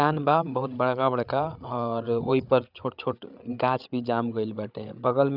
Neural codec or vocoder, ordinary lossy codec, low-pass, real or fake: codec, 16 kHz, 8 kbps, FreqCodec, larger model; none; 5.4 kHz; fake